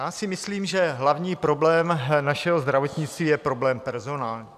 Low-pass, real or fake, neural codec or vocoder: 14.4 kHz; real; none